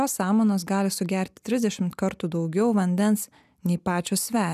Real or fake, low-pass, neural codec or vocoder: real; 14.4 kHz; none